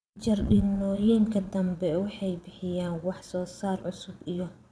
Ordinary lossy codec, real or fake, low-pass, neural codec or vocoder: none; fake; none; vocoder, 22.05 kHz, 80 mel bands, Vocos